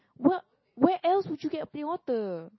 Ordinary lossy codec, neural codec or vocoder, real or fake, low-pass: MP3, 24 kbps; none; real; 7.2 kHz